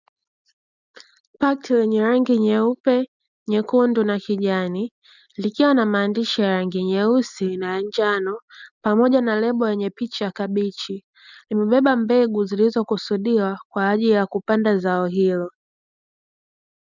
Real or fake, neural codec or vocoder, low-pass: real; none; 7.2 kHz